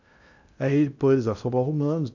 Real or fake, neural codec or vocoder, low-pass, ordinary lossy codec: fake; codec, 16 kHz in and 24 kHz out, 0.6 kbps, FocalCodec, streaming, 2048 codes; 7.2 kHz; none